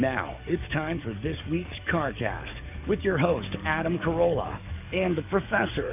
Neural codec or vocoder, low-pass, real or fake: codec, 44.1 kHz, 7.8 kbps, DAC; 3.6 kHz; fake